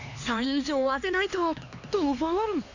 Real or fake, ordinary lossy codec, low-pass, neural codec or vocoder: fake; none; 7.2 kHz; codec, 16 kHz, 2 kbps, X-Codec, HuBERT features, trained on LibriSpeech